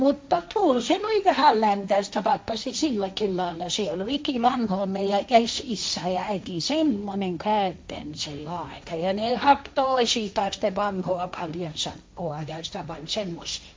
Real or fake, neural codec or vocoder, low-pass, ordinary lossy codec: fake; codec, 16 kHz, 1.1 kbps, Voila-Tokenizer; none; none